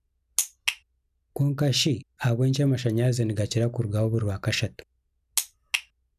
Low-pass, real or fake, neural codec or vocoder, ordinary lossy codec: 14.4 kHz; real; none; none